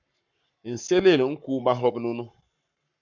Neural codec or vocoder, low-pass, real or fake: codec, 44.1 kHz, 7.8 kbps, Pupu-Codec; 7.2 kHz; fake